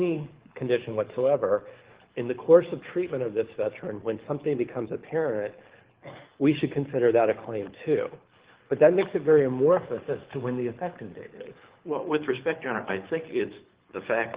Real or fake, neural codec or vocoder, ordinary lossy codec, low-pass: fake; codec, 24 kHz, 6 kbps, HILCodec; Opus, 16 kbps; 3.6 kHz